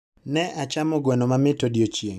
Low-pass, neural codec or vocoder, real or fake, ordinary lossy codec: 14.4 kHz; vocoder, 44.1 kHz, 128 mel bands every 256 samples, BigVGAN v2; fake; none